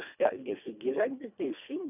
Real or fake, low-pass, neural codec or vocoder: fake; 3.6 kHz; codec, 24 kHz, 1.5 kbps, HILCodec